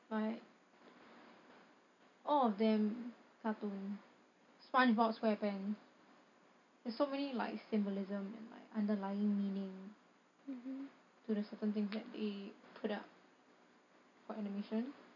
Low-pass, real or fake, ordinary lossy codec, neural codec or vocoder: 7.2 kHz; real; none; none